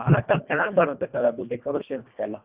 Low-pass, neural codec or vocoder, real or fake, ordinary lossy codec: 3.6 kHz; codec, 24 kHz, 1.5 kbps, HILCodec; fake; Opus, 64 kbps